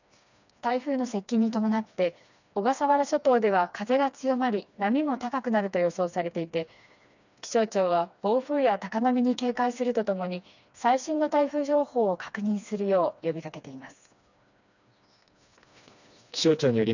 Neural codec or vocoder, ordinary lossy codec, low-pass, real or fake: codec, 16 kHz, 2 kbps, FreqCodec, smaller model; none; 7.2 kHz; fake